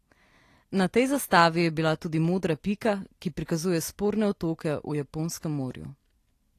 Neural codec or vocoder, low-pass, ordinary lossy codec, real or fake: none; 14.4 kHz; AAC, 48 kbps; real